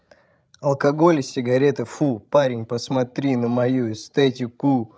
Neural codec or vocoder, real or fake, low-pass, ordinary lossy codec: codec, 16 kHz, 16 kbps, FreqCodec, larger model; fake; none; none